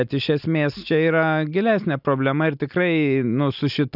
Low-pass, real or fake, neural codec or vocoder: 5.4 kHz; real; none